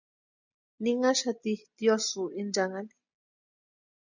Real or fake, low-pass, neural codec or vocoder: real; 7.2 kHz; none